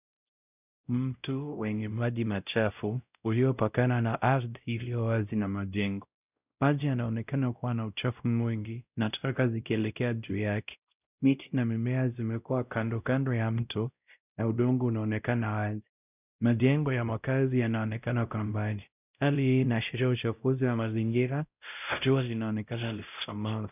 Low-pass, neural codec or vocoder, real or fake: 3.6 kHz; codec, 16 kHz, 0.5 kbps, X-Codec, WavLM features, trained on Multilingual LibriSpeech; fake